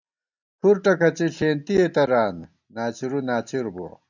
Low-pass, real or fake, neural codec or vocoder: 7.2 kHz; real; none